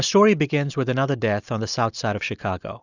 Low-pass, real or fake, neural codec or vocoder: 7.2 kHz; real; none